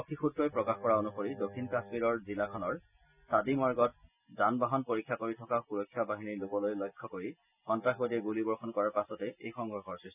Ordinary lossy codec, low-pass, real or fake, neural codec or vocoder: none; 3.6 kHz; real; none